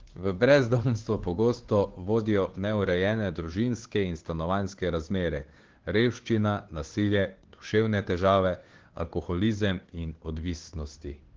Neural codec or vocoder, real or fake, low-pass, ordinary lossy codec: codec, 16 kHz, 2 kbps, FunCodec, trained on Chinese and English, 25 frames a second; fake; 7.2 kHz; Opus, 16 kbps